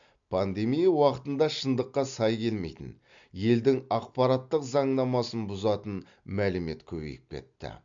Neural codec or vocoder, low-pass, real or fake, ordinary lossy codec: none; 7.2 kHz; real; MP3, 64 kbps